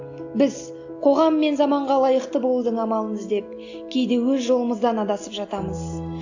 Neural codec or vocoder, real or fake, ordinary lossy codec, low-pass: none; real; AAC, 48 kbps; 7.2 kHz